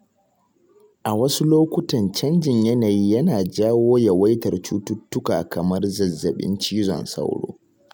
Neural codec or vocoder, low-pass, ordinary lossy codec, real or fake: none; none; none; real